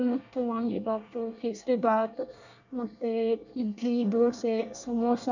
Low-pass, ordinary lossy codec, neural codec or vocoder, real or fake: 7.2 kHz; none; codec, 24 kHz, 1 kbps, SNAC; fake